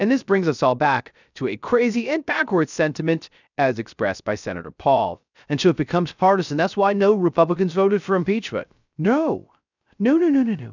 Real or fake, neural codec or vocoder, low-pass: fake; codec, 16 kHz, 0.3 kbps, FocalCodec; 7.2 kHz